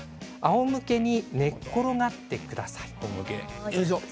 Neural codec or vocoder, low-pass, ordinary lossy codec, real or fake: none; none; none; real